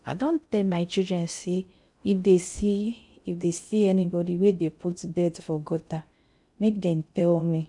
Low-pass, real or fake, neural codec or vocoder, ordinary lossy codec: 10.8 kHz; fake; codec, 16 kHz in and 24 kHz out, 0.6 kbps, FocalCodec, streaming, 4096 codes; none